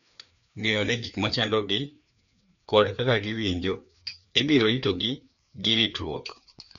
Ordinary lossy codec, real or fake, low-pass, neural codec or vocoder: none; fake; 7.2 kHz; codec, 16 kHz, 2 kbps, FreqCodec, larger model